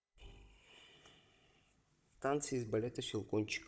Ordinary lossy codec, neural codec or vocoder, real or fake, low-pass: none; codec, 16 kHz, 16 kbps, FunCodec, trained on Chinese and English, 50 frames a second; fake; none